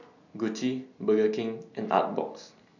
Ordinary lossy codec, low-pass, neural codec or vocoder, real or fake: none; 7.2 kHz; none; real